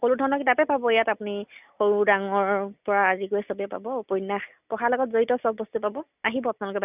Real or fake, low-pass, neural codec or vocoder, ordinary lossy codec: real; 3.6 kHz; none; none